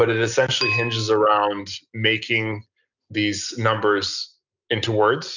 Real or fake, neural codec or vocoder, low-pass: real; none; 7.2 kHz